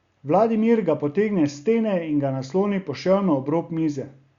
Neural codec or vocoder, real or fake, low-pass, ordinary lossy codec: none; real; 7.2 kHz; Opus, 64 kbps